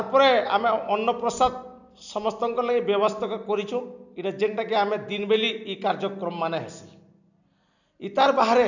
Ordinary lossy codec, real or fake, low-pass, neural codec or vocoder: none; real; 7.2 kHz; none